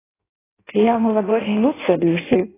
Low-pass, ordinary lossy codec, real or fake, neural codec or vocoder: 3.6 kHz; AAC, 16 kbps; fake; codec, 16 kHz in and 24 kHz out, 0.6 kbps, FireRedTTS-2 codec